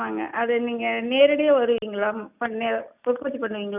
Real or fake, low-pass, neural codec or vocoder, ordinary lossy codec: real; 3.6 kHz; none; none